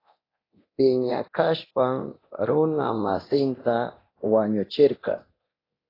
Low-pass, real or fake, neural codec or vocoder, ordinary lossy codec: 5.4 kHz; fake; codec, 24 kHz, 0.9 kbps, DualCodec; AAC, 24 kbps